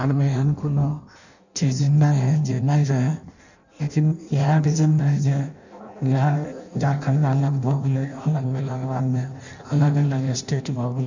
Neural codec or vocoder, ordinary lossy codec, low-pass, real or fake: codec, 16 kHz in and 24 kHz out, 0.6 kbps, FireRedTTS-2 codec; none; 7.2 kHz; fake